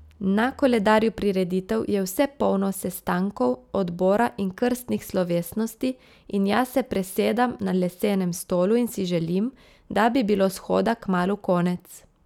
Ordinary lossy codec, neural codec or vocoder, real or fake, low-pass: none; none; real; 19.8 kHz